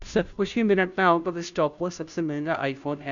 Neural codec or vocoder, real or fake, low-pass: codec, 16 kHz, 0.5 kbps, FunCodec, trained on Chinese and English, 25 frames a second; fake; 7.2 kHz